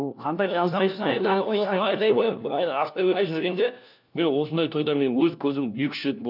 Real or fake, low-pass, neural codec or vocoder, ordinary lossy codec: fake; 5.4 kHz; codec, 16 kHz, 1 kbps, FunCodec, trained on LibriTTS, 50 frames a second; none